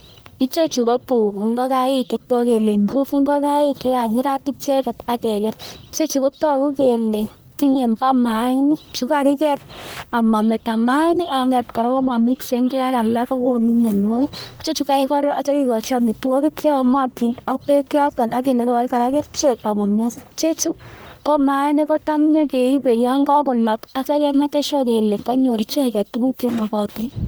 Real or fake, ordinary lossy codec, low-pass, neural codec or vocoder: fake; none; none; codec, 44.1 kHz, 1.7 kbps, Pupu-Codec